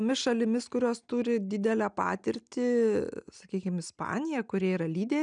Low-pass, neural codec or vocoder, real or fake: 9.9 kHz; none; real